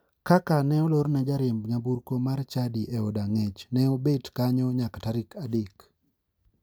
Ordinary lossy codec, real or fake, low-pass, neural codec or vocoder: none; real; none; none